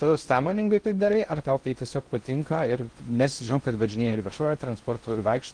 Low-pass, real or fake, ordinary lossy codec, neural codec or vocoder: 9.9 kHz; fake; Opus, 24 kbps; codec, 16 kHz in and 24 kHz out, 0.6 kbps, FocalCodec, streaming, 2048 codes